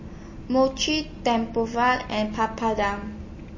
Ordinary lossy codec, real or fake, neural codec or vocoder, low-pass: MP3, 32 kbps; real; none; 7.2 kHz